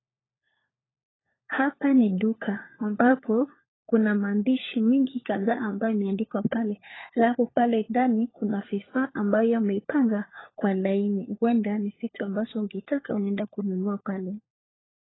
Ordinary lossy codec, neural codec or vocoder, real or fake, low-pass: AAC, 16 kbps; codec, 16 kHz, 4 kbps, FunCodec, trained on LibriTTS, 50 frames a second; fake; 7.2 kHz